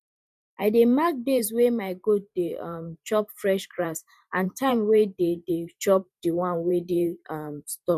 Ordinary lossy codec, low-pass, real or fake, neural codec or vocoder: none; 14.4 kHz; fake; vocoder, 44.1 kHz, 128 mel bands every 512 samples, BigVGAN v2